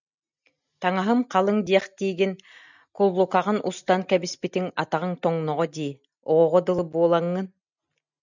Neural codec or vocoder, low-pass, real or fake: none; 7.2 kHz; real